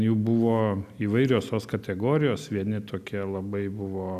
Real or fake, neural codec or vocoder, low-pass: real; none; 14.4 kHz